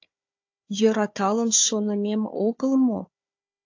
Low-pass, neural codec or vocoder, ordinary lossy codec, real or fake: 7.2 kHz; codec, 16 kHz, 4 kbps, FunCodec, trained on Chinese and English, 50 frames a second; AAC, 48 kbps; fake